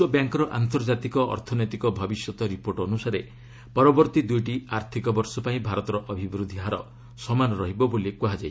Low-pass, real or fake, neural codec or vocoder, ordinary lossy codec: none; real; none; none